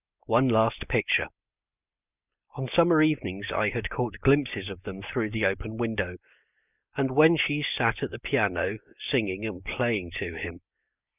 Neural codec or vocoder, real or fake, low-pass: none; real; 3.6 kHz